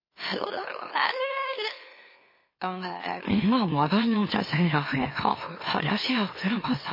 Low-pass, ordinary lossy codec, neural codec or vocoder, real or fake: 5.4 kHz; MP3, 24 kbps; autoencoder, 44.1 kHz, a latent of 192 numbers a frame, MeloTTS; fake